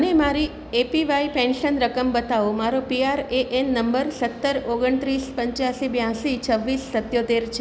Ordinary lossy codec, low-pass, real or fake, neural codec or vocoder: none; none; real; none